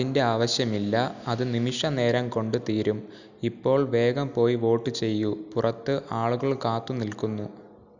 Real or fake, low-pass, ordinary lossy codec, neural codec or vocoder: real; 7.2 kHz; none; none